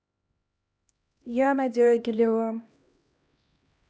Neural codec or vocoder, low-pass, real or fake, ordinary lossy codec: codec, 16 kHz, 1 kbps, X-Codec, HuBERT features, trained on LibriSpeech; none; fake; none